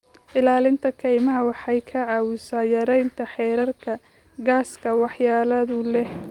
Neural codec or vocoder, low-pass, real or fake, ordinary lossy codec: vocoder, 44.1 kHz, 128 mel bands every 512 samples, BigVGAN v2; 19.8 kHz; fake; Opus, 32 kbps